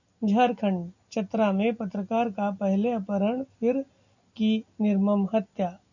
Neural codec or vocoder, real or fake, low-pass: none; real; 7.2 kHz